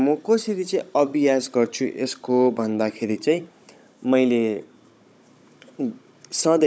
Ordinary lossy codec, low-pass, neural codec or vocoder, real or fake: none; none; codec, 16 kHz, 16 kbps, FunCodec, trained on Chinese and English, 50 frames a second; fake